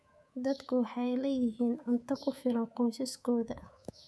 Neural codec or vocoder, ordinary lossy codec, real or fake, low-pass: codec, 24 kHz, 3.1 kbps, DualCodec; none; fake; none